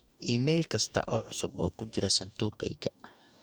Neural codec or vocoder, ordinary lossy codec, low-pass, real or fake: codec, 44.1 kHz, 2.6 kbps, DAC; none; none; fake